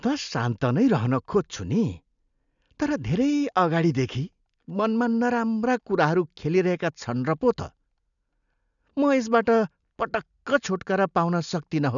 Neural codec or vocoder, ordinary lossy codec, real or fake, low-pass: none; none; real; 7.2 kHz